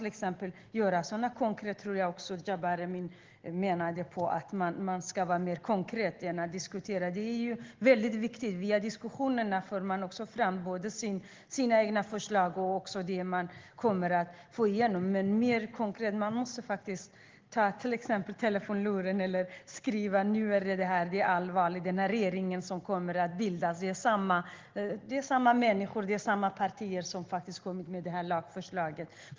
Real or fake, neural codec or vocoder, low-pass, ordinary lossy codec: real; none; 7.2 kHz; Opus, 16 kbps